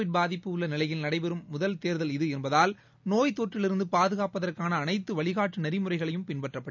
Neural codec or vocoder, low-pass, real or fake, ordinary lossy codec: none; 7.2 kHz; real; none